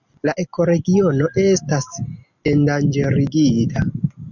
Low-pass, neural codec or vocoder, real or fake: 7.2 kHz; none; real